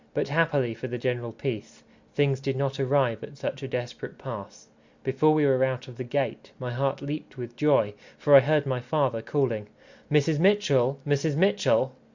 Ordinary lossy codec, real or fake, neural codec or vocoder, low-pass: Opus, 64 kbps; real; none; 7.2 kHz